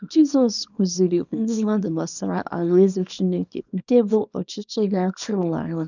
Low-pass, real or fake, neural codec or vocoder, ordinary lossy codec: 7.2 kHz; fake; codec, 24 kHz, 0.9 kbps, WavTokenizer, small release; none